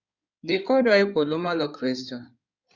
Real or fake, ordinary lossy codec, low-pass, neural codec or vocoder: fake; Opus, 64 kbps; 7.2 kHz; codec, 16 kHz in and 24 kHz out, 2.2 kbps, FireRedTTS-2 codec